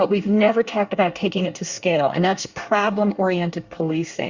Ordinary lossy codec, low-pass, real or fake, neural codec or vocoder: Opus, 64 kbps; 7.2 kHz; fake; codec, 24 kHz, 1 kbps, SNAC